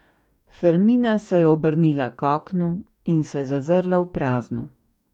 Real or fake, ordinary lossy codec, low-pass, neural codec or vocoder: fake; none; 19.8 kHz; codec, 44.1 kHz, 2.6 kbps, DAC